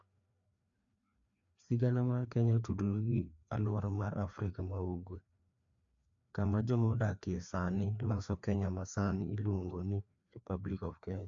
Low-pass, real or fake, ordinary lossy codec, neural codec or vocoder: 7.2 kHz; fake; AAC, 64 kbps; codec, 16 kHz, 2 kbps, FreqCodec, larger model